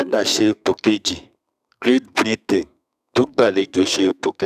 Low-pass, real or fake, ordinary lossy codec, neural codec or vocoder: 14.4 kHz; fake; none; codec, 32 kHz, 1.9 kbps, SNAC